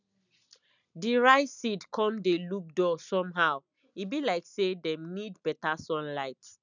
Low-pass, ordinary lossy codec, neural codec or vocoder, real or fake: 7.2 kHz; none; none; real